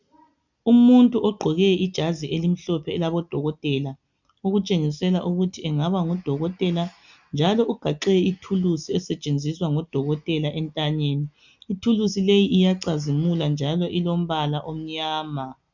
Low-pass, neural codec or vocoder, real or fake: 7.2 kHz; none; real